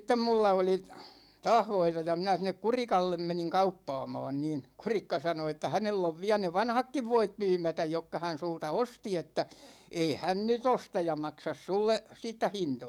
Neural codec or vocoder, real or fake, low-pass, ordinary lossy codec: codec, 44.1 kHz, 7.8 kbps, DAC; fake; 19.8 kHz; none